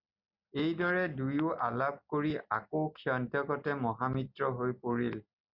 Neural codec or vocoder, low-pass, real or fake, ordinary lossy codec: none; 5.4 kHz; real; Opus, 64 kbps